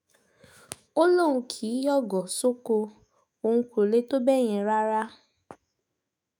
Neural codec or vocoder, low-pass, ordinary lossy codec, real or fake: autoencoder, 48 kHz, 128 numbers a frame, DAC-VAE, trained on Japanese speech; none; none; fake